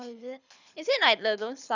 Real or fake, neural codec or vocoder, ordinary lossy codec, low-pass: fake; codec, 24 kHz, 6 kbps, HILCodec; none; 7.2 kHz